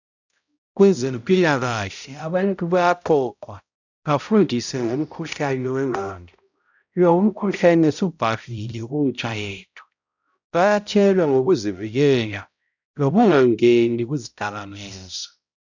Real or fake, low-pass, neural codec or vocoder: fake; 7.2 kHz; codec, 16 kHz, 0.5 kbps, X-Codec, HuBERT features, trained on balanced general audio